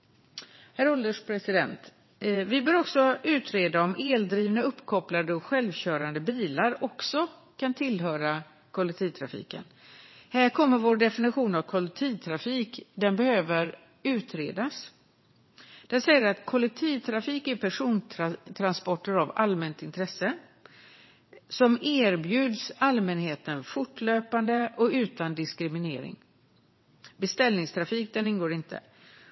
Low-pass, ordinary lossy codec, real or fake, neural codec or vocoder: 7.2 kHz; MP3, 24 kbps; fake; vocoder, 22.05 kHz, 80 mel bands, WaveNeXt